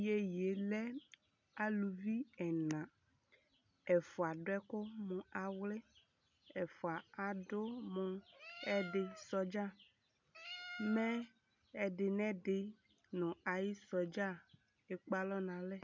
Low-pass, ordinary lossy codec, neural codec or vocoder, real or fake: 7.2 kHz; MP3, 64 kbps; none; real